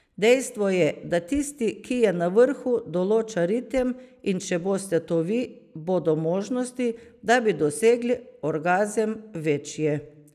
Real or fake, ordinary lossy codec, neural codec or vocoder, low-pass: real; none; none; 14.4 kHz